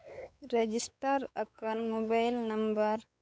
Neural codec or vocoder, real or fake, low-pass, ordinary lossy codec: codec, 16 kHz, 4 kbps, X-Codec, WavLM features, trained on Multilingual LibriSpeech; fake; none; none